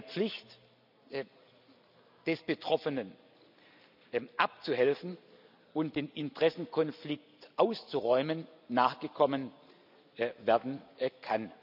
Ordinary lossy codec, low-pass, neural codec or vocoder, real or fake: none; 5.4 kHz; none; real